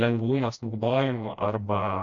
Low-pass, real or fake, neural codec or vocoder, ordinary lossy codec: 7.2 kHz; fake; codec, 16 kHz, 1 kbps, FreqCodec, smaller model; MP3, 48 kbps